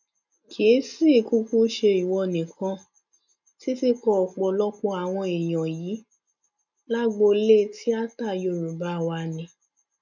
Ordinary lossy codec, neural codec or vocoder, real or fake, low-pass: none; none; real; 7.2 kHz